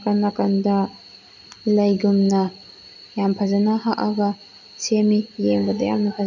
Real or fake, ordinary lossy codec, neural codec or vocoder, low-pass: real; none; none; 7.2 kHz